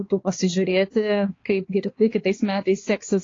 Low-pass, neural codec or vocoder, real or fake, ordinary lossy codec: 7.2 kHz; codec, 16 kHz, 2 kbps, X-Codec, HuBERT features, trained on balanced general audio; fake; AAC, 32 kbps